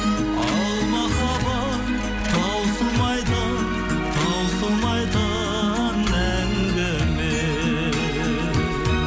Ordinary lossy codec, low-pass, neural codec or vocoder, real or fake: none; none; none; real